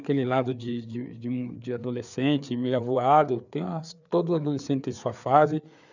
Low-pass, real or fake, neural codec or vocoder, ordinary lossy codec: 7.2 kHz; fake; codec, 16 kHz, 4 kbps, FreqCodec, larger model; none